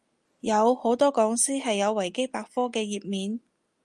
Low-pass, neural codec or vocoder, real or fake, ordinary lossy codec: 10.8 kHz; none; real; Opus, 32 kbps